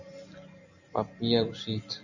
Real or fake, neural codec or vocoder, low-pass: real; none; 7.2 kHz